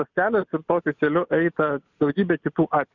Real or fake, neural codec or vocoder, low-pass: real; none; 7.2 kHz